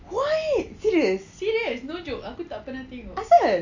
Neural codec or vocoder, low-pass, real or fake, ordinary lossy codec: none; 7.2 kHz; real; AAC, 48 kbps